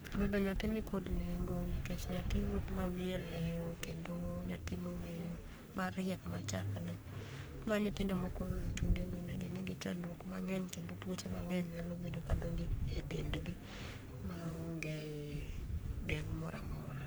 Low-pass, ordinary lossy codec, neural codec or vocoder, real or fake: none; none; codec, 44.1 kHz, 3.4 kbps, Pupu-Codec; fake